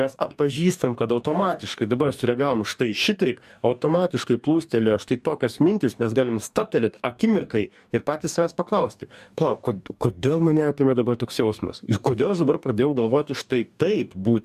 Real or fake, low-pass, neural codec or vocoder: fake; 14.4 kHz; codec, 44.1 kHz, 2.6 kbps, DAC